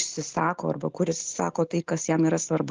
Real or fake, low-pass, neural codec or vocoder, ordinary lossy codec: real; 7.2 kHz; none; Opus, 32 kbps